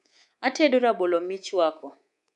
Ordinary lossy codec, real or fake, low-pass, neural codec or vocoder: none; fake; 10.8 kHz; codec, 24 kHz, 3.1 kbps, DualCodec